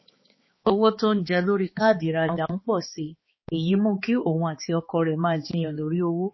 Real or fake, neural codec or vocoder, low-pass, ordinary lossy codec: fake; codec, 16 kHz, 4 kbps, X-Codec, HuBERT features, trained on balanced general audio; 7.2 kHz; MP3, 24 kbps